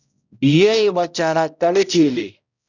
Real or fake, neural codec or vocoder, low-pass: fake; codec, 16 kHz, 0.5 kbps, X-Codec, HuBERT features, trained on balanced general audio; 7.2 kHz